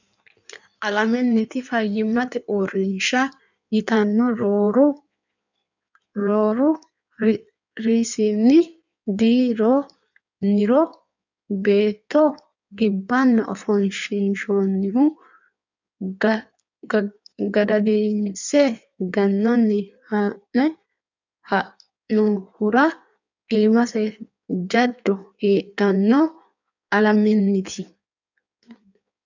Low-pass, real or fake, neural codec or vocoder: 7.2 kHz; fake; codec, 16 kHz in and 24 kHz out, 1.1 kbps, FireRedTTS-2 codec